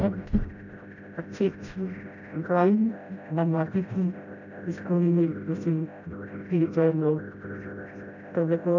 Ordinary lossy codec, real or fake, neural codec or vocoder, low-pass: none; fake; codec, 16 kHz, 0.5 kbps, FreqCodec, smaller model; 7.2 kHz